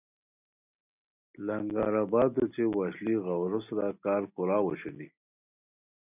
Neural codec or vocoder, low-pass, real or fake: none; 3.6 kHz; real